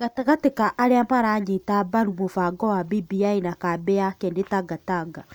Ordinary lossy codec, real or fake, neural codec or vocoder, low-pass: none; real; none; none